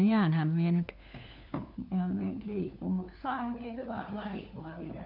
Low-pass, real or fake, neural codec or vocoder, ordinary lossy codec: 5.4 kHz; fake; codec, 16 kHz, 4 kbps, FunCodec, trained on LibriTTS, 50 frames a second; none